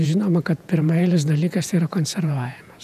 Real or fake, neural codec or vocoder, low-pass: fake; vocoder, 48 kHz, 128 mel bands, Vocos; 14.4 kHz